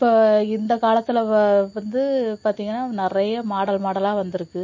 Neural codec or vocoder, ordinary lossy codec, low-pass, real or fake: none; MP3, 32 kbps; 7.2 kHz; real